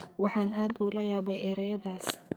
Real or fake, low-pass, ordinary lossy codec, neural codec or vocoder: fake; none; none; codec, 44.1 kHz, 2.6 kbps, SNAC